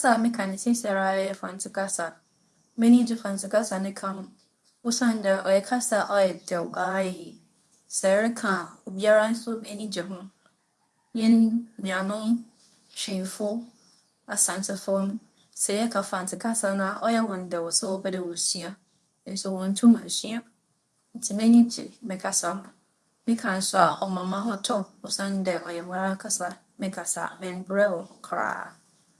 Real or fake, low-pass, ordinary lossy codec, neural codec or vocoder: fake; none; none; codec, 24 kHz, 0.9 kbps, WavTokenizer, medium speech release version 2